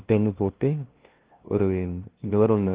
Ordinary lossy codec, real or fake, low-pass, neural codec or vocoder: Opus, 16 kbps; fake; 3.6 kHz; codec, 16 kHz, 0.5 kbps, FunCodec, trained on LibriTTS, 25 frames a second